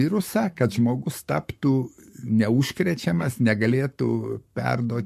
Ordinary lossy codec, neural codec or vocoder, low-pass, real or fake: MP3, 64 kbps; codec, 44.1 kHz, 7.8 kbps, Pupu-Codec; 14.4 kHz; fake